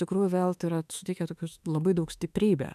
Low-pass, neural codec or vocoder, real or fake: 14.4 kHz; autoencoder, 48 kHz, 32 numbers a frame, DAC-VAE, trained on Japanese speech; fake